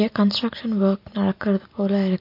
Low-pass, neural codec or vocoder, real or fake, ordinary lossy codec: 5.4 kHz; none; real; MP3, 32 kbps